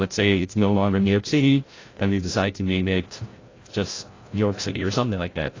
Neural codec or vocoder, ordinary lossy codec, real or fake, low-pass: codec, 16 kHz, 0.5 kbps, FreqCodec, larger model; AAC, 32 kbps; fake; 7.2 kHz